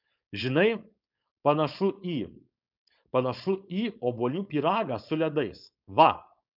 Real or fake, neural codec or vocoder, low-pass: fake; codec, 16 kHz, 4.8 kbps, FACodec; 5.4 kHz